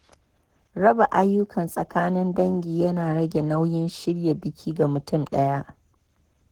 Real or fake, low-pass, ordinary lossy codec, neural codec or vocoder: fake; 19.8 kHz; Opus, 16 kbps; codec, 44.1 kHz, 7.8 kbps, Pupu-Codec